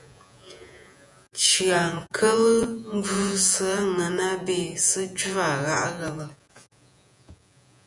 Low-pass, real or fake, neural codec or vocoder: 10.8 kHz; fake; vocoder, 48 kHz, 128 mel bands, Vocos